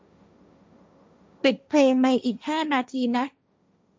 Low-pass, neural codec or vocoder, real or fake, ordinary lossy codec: none; codec, 16 kHz, 1.1 kbps, Voila-Tokenizer; fake; none